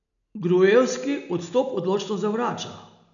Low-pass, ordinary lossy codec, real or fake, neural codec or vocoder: 7.2 kHz; none; real; none